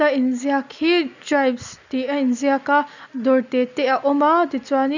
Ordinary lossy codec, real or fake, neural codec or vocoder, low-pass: none; real; none; 7.2 kHz